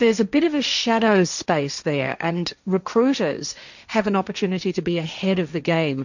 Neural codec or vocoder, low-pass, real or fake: codec, 16 kHz, 1.1 kbps, Voila-Tokenizer; 7.2 kHz; fake